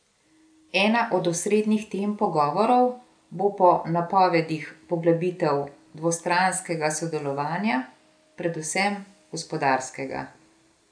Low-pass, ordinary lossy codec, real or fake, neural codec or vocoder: 9.9 kHz; none; real; none